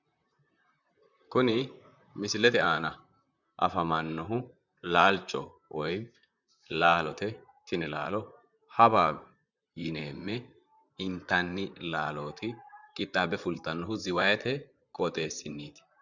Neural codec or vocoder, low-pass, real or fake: vocoder, 44.1 kHz, 128 mel bands, Pupu-Vocoder; 7.2 kHz; fake